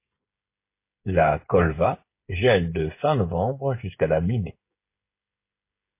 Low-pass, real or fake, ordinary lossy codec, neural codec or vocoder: 3.6 kHz; fake; MP3, 24 kbps; codec, 16 kHz, 16 kbps, FreqCodec, smaller model